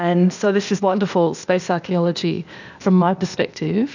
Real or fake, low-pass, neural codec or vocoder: fake; 7.2 kHz; codec, 16 kHz, 0.8 kbps, ZipCodec